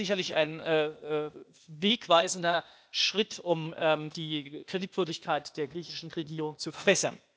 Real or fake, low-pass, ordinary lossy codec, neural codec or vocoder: fake; none; none; codec, 16 kHz, 0.8 kbps, ZipCodec